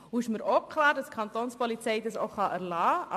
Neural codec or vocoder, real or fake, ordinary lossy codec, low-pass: none; real; MP3, 64 kbps; 14.4 kHz